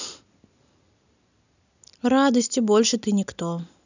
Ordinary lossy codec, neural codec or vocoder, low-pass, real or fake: none; none; 7.2 kHz; real